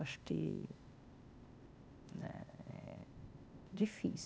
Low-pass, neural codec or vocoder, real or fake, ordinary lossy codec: none; codec, 16 kHz, 0.8 kbps, ZipCodec; fake; none